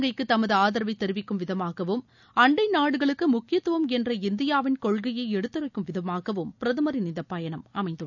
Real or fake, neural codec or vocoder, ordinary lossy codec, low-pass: real; none; none; 7.2 kHz